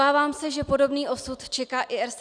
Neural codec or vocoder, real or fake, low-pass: none; real; 9.9 kHz